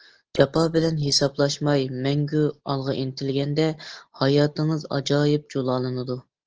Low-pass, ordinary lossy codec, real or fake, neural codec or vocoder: 7.2 kHz; Opus, 16 kbps; real; none